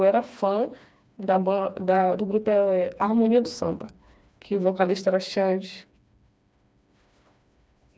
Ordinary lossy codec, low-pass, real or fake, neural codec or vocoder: none; none; fake; codec, 16 kHz, 2 kbps, FreqCodec, smaller model